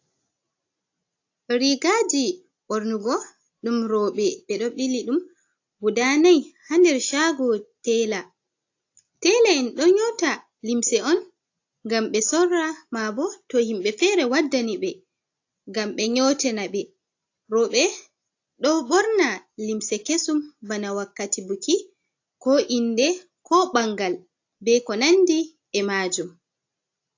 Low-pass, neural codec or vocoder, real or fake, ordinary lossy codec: 7.2 kHz; none; real; AAC, 48 kbps